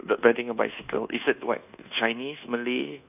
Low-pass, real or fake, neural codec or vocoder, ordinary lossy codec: 3.6 kHz; fake; codec, 24 kHz, 1.2 kbps, DualCodec; none